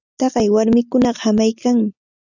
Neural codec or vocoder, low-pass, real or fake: none; 7.2 kHz; real